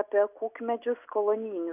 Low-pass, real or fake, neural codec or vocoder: 3.6 kHz; real; none